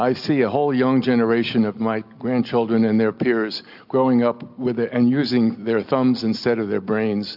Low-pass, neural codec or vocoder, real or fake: 5.4 kHz; none; real